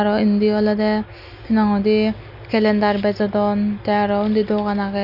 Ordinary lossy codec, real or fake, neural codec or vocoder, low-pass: none; real; none; 5.4 kHz